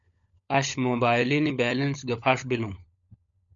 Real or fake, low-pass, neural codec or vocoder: fake; 7.2 kHz; codec, 16 kHz, 16 kbps, FunCodec, trained on LibriTTS, 50 frames a second